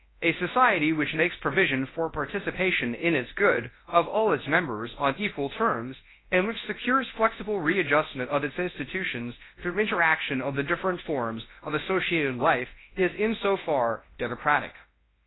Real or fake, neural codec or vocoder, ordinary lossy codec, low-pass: fake; codec, 24 kHz, 0.9 kbps, WavTokenizer, large speech release; AAC, 16 kbps; 7.2 kHz